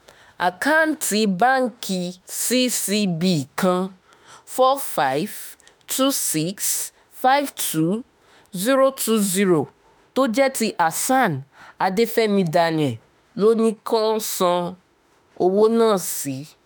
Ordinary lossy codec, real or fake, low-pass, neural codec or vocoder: none; fake; none; autoencoder, 48 kHz, 32 numbers a frame, DAC-VAE, trained on Japanese speech